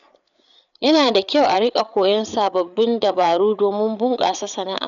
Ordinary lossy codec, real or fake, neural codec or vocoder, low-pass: none; fake; codec, 16 kHz, 8 kbps, FreqCodec, larger model; 7.2 kHz